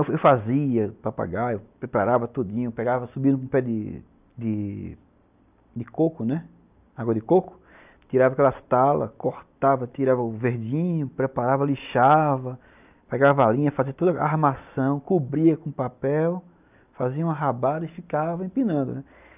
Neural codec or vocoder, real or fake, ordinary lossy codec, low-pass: none; real; none; 3.6 kHz